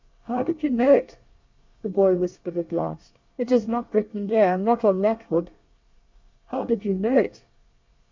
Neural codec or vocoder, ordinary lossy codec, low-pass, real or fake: codec, 24 kHz, 1 kbps, SNAC; MP3, 64 kbps; 7.2 kHz; fake